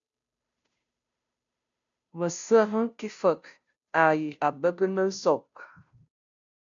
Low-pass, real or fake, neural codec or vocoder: 7.2 kHz; fake; codec, 16 kHz, 0.5 kbps, FunCodec, trained on Chinese and English, 25 frames a second